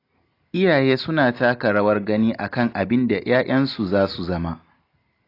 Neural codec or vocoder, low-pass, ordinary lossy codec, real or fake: none; 5.4 kHz; AAC, 32 kbps; real